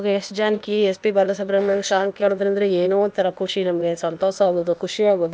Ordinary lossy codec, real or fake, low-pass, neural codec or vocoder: none; fake; none; codec, 16 kHz, 0.8 kbps, ZipCodec